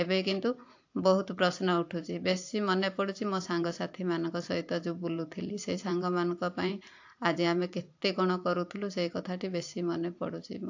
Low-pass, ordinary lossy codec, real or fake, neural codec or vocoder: 7.2 kHz; AAC, 48 kbps; fake; vocoder, 44.1 kHz, 128 mel bands every 512 samples, BigVGAN v2